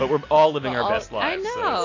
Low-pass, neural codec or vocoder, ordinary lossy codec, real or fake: 7.2 kHz; none; AAC, 32 kbps; real